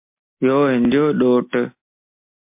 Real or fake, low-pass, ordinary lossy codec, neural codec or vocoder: real; 3.6 kHz; MP3, 24 kbps; none